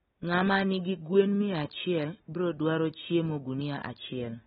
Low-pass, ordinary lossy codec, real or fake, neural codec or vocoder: 7.2 kHz; AAC, 16 kbps; real; none